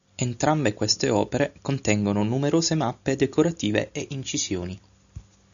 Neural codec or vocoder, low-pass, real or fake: none; 7.2 kHz; real